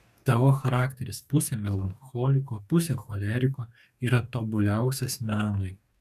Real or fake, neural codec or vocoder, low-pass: fake; codec, 44.1 kHz, 2.6 kbps, DAC; 14.4 kHz